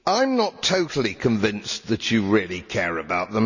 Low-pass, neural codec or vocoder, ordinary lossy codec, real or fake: 7.2 kHz; none; none; real